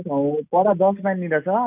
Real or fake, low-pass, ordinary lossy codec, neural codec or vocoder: real; 3.6 kHz; none; none